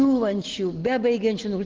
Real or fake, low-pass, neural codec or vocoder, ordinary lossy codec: real; 7.2 kHz; none; Opus, 16 kbps